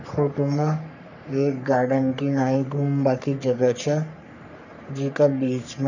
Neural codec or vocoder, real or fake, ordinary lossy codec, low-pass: codec, 44.1 kHz, 3.4 kbps, Pupu-Codec; fake; none; 7.2 kHz